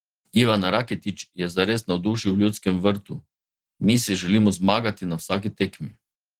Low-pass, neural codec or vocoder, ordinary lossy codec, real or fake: 19.8 kHz; vocoder, 44.1 kHz, 128 mel bands every 512 samples, BigVGAN v2; Opus, 16 kbps; fake